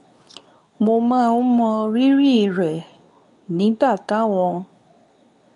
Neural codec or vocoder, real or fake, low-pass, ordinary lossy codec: codec, 24 kHz, 0.9 kbps, WavTokenizer, medium speech release version 2; fake; 10.8 kHz; none